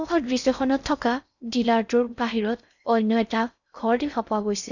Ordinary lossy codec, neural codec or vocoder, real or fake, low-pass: none; codec, 16 kHz in and 24 kHz out, 0.6 kbps, FocalCodec, streaming, 4096 codes; fake; 7.2 kHz